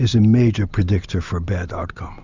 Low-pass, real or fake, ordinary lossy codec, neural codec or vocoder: 7.2 kHz; real; Opus, 64 kbps; none